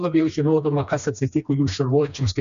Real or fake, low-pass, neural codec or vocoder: fake; 7.2 kHz; codec, 16 kHz, 2 kbps, FreqCodec, smaller model